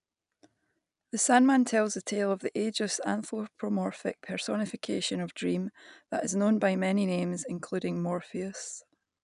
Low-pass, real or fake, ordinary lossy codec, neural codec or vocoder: 10.8 kHz; real; none; none